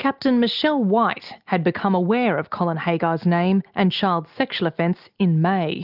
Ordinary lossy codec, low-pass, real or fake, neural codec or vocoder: Opus, 24 kbps; 5.4 kHz; real; none